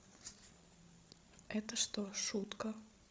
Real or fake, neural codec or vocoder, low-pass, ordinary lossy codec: fake; codec, 16 kHz, 16 kbps, FreqCodec, larger model; none; none